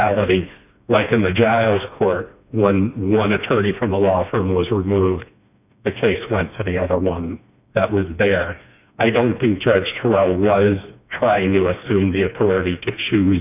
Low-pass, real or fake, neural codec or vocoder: 3.6 kHz; fake; codec, 16 kHz, 2 kbps, FreqCodec, smaller model